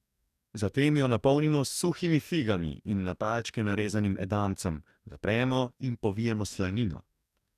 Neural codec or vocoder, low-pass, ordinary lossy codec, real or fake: codec, 44.1 kHz, 2.6 kbps, DAC; 14.4 kHz; none; fake